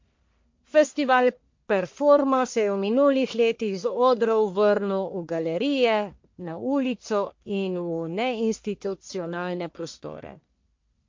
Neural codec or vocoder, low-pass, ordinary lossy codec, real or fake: codec, 44.1 kHz, 1.7 kbps, Pupu-Codec; 7.2 kHz; MP3, 48 kbps; fake